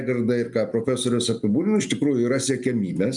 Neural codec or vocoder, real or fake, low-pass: none; real; 10.8 kHz